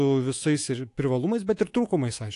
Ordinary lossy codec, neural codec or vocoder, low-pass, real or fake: AAC, 48 kbps; codec, 24 kHz, 3.1 kbps, DualCodec; 10.8 kHz; fake